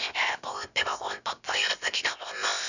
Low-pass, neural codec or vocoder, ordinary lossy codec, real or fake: 7.2 kHz; codec, 16 kHz, 0.7 kbps, FocalCodec; none; fake